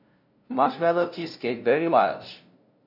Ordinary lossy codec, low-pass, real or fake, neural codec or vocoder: none; 5.4 kHz; fake; codec, 16 kHz, 0.5 kbps, FunCodec, trained on LibriTTS, 25 frames a second